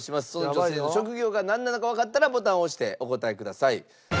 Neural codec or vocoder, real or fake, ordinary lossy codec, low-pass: none; real; none; none